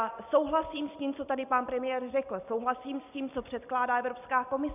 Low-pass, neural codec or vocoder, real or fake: 3.6 kHz; none; real